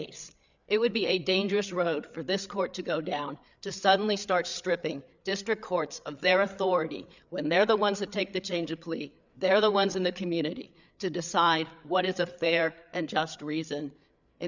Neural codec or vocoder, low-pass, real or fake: codec, 16 kHz, 8 kbps, FreqCodec, larger model; 7.2 kHz; fake